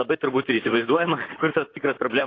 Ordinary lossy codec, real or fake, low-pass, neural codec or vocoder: AAC, 32 kbps; fake; 7.2 kHz; vocoder, 44.1 kHz, 128 mel bands, Pupu-Vocoder